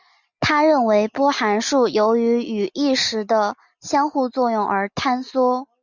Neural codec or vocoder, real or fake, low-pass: none; real; 7.2 kHz